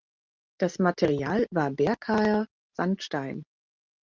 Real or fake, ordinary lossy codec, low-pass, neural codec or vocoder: real; Opus, 24 kbps; 7.2 kHz; none